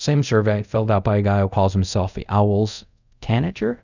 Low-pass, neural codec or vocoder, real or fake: 7.2 kHz; codec, 24 kHz, 0.5 kbps, DualCodec; fake